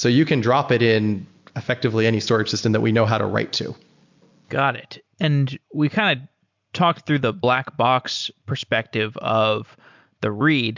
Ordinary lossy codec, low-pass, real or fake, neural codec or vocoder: MP3, 64 kbps; 7.2 kHz; real; none